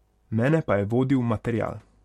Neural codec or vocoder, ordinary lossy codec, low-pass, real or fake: none; MP3, 64 kbps; 19.8 kHz; real